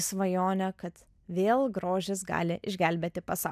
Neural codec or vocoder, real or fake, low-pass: none; real; 14.4 kHz